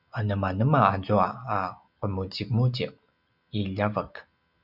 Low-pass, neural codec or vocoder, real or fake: 5.4 kHz; none; real